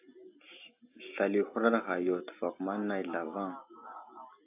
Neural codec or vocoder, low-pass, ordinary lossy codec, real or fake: none; 3.6 kHz; AAC, 32 kbps; real